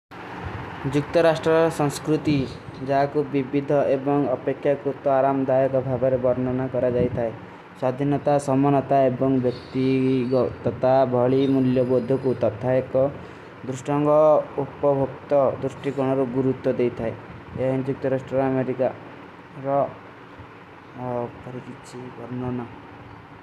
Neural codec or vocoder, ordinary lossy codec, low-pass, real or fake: none; none; 14.4 kHz; real